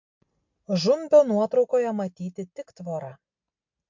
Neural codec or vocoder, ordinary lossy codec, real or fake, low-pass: none; MP3, 48 kbps; real; 7.2 kHz